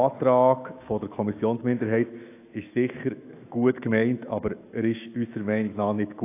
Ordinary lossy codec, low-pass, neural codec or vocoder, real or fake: AAC, 24 kbps; 3.6 kHz; autoencoder, 48 kHz, 128 numbers a frame, DAC-VAE, trained on Japanese speech; fake